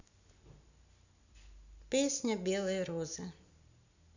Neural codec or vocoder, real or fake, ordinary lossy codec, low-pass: none; real; none; 7.2 kHz